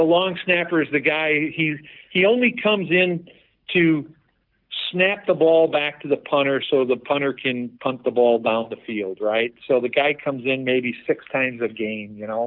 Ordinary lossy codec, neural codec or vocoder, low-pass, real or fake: Opus, 32 kbps; none; 5.4 kHz; real